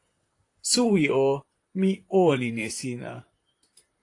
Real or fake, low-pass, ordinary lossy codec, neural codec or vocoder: fake; 10.8 kHz; AAC, 48 kbps; vocoder, 44.1 kHz, 128 mel bands, Pupu-Vocoder